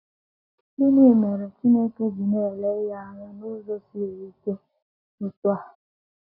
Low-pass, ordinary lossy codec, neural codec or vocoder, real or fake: 5.4 kHz; none; none; real